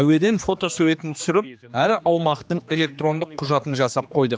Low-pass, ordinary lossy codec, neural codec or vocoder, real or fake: none; none; codec, 16 kHz, 2 kbps, X-Codec, HuBERT features, trained on general audio; fake